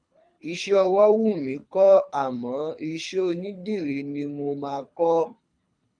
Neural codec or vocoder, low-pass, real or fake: codec, 24 kHz, 3 kbps, HILCodec; 9.9 kHz; fake